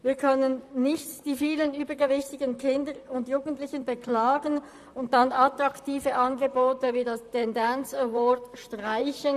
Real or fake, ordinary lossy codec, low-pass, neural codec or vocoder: fake; none; 14.4 kHz; vocoder, 44.1 kHz, 128 mel bands, Pupu-Vocoder